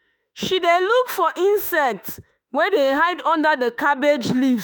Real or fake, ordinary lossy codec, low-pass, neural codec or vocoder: fake; none; none; autoencoder, 48 kHz, 32 numbers a frame, DAC-VAE, trained on Japanese speech